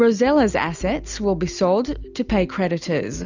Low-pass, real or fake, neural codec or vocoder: 7.2 kHz; real; none